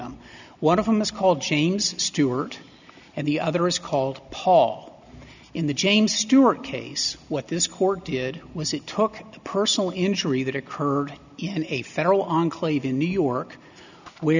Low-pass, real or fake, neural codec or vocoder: 7.2 kHz; real; none